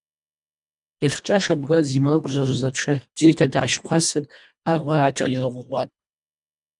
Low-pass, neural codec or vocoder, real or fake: 10.8 kHz; codec, 24 kHz, 1.5 kbps, HILCodec; fake